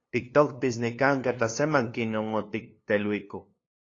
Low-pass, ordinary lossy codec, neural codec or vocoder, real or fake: 7.2 kHz; AAC, 32 kbps; codec, 16 kHz, 2 kbps, FunCodec, trained on LibriTTS, 25 frames a second; fake